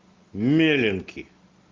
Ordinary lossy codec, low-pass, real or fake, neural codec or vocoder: Opus, 24 kbps; 7.2 kHz; real; none